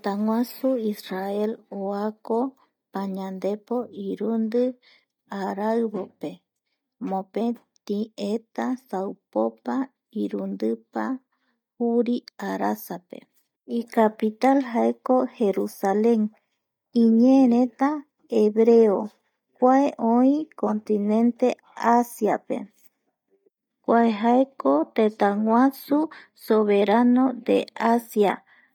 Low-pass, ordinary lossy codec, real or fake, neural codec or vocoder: 19.8 kHz; none; real; none